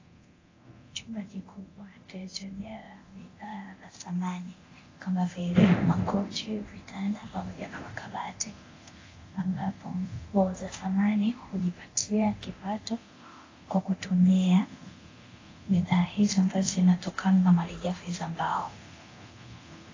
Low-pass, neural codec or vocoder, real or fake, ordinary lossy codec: 7.2 kHz; codec, 24 kHz, 0.9 kbps, DualCodec; fake; AAC, 32 kbps